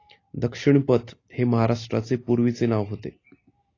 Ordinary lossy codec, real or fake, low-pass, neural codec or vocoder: AAC, 32 kbps; real; 7.2 kHz; none